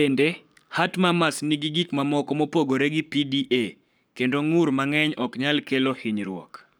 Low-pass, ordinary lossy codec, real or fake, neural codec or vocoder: none; none; fake; codec, 44.1 kHz, 7.8 kbps, Pupu-Codec